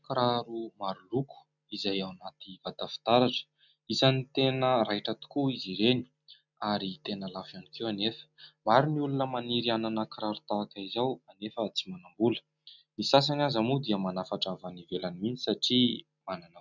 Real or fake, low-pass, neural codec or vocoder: real; 7.2 kHz; none